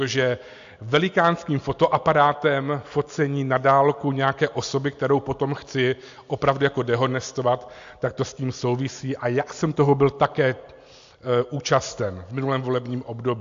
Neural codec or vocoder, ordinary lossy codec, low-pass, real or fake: none; AAC, 64 kbps; 7.2 kHz; real